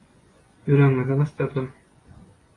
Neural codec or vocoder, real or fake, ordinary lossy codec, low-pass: vocoder, 24 kHz, 100 mel bands, Vocos; fake; AAC, 32 kbps; 10.8 kHz